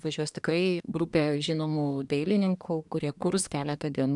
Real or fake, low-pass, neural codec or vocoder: fake; 10.8 kHz; codec, 24 kHz, 1 kbps, SNAC